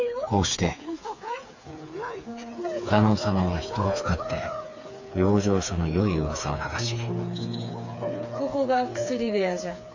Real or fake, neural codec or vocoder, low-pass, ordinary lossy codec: fake; codec, 16 kHz, 4 kbps, FreqCodec, smaller model; 7.2 kHz; AAC, 48 kbps